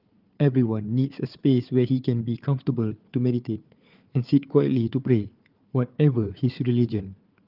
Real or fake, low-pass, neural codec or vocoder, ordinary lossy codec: fake; 5.4 kHz; codec, 16 kHz, 4 kbps, FunCodec, trained on Chinese and English, 50 frames a second; Opus, 24 kbps